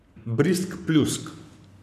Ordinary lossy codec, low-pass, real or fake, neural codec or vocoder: none; 14.4 kHz; fake; codec, 44.1 kHz, 7.8 kbps, Pupu-Codec